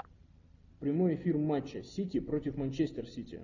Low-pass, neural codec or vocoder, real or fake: 7.2 kHz; none; real